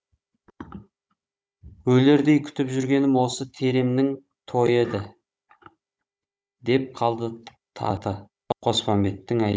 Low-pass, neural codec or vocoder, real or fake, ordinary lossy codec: none; codec, 16 kHz, 16 kbps, FunCodec, trained on Chinese and English, 50 frames a second; fake; none